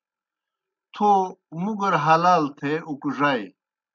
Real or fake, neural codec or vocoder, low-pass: real; none; 7.2 kHz